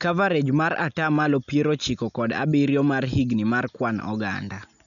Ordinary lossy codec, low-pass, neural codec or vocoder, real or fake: none; 7.2 kHz; none; real